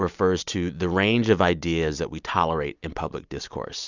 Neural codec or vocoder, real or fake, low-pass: none; real; 7.2 kHz